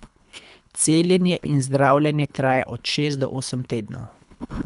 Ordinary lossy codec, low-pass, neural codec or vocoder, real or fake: none; 10.8 kHz; codec, 24 kHz, 3 kbps, HILCodec; fake